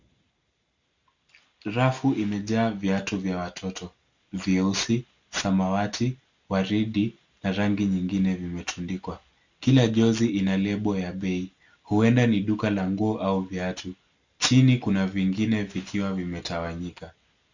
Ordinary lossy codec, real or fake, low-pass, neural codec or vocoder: Opus, 64 kbps; real; 7.2 kHz; none